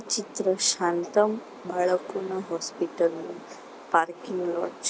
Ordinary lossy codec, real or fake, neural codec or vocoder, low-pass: none; real; none; none